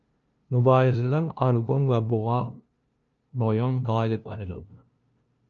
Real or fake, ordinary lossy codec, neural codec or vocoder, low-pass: fake; Opus, 24 kbps; codec, 16 kHz, 0.5 kbps, FunCodec, trained on LibriTTS, 25 frames a second; 7.2 kHz